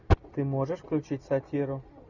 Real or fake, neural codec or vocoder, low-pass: real; none; 7.2 kHz